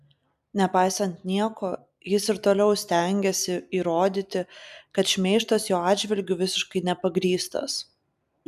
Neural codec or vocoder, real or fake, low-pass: none; real; 14.4 kHz